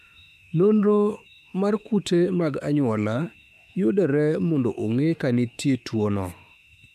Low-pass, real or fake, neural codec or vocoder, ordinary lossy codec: 14.4 kHz; fake; autoencoder, 48 kHz, 32 numbers a frame, DAC-VAE, trained on Japanese speech; none